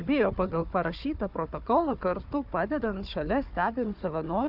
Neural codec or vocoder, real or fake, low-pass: codec, 16 kHz, 4 kbps, FunCodec, trained on Chinese and English, 50 frames a second; fake; 5.4 kHz